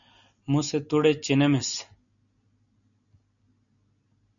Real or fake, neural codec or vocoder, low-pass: real; none; 7.2 kHz